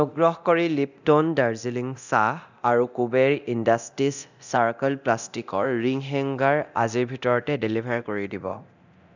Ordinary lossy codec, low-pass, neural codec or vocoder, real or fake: none; 7.2 kHz; codec, 24 kHz, 0.9 kbps, DualCodec; fake